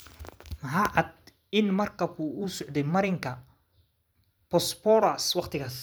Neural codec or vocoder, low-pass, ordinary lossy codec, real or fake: vocoder, 44.1 kHz, 128 mel bands every 512 samples, BigVGAN v2; none; none; fake